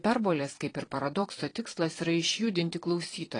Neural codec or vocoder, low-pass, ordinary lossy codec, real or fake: vocoder, 22.05 kHz, 80 mel bands, Vocos; 9.9 kHz; AAC, 32 kbps; fake